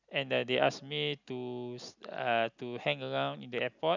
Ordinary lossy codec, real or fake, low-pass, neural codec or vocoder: none; real; 7.2 kHz; none